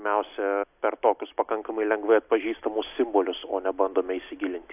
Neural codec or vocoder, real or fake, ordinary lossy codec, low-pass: none; real; AAC, 32 kbps; 3.6 kHz